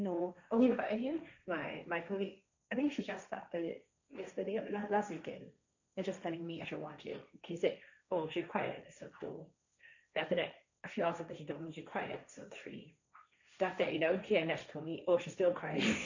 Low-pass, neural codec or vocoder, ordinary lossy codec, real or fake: 7.2 kHz; codec, 16 kHz, 1.1 kbps, Voila-Tokenizer; none; fake